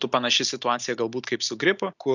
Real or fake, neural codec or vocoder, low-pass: real; none; 7.2 kHz